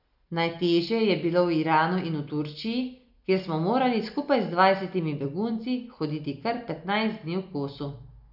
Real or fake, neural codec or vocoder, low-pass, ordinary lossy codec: real; none; 5.4 kHz; none